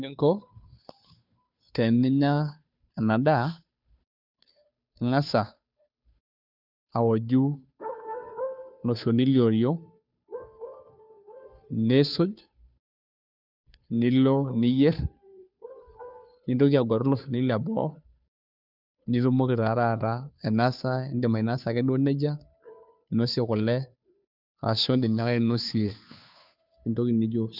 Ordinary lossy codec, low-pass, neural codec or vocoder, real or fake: none; 5.4 kHz; codec, 16 kHz, 2 kbps, FunCodec, trained on Chinese and English, 25 frames a second; fake